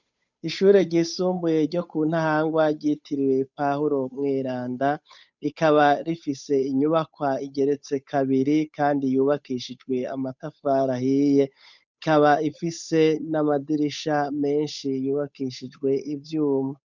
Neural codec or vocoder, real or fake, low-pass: codec, 16 kHz, 8 kbps, FunCodec, trained on Chinese and English, 25 frames a second; fake; 7.2 kHz